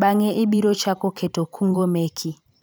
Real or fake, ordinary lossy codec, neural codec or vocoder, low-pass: fake; none; vocoder, 44.1 kHz, 128 mel bands every 512 samples, BigVGAN v2; none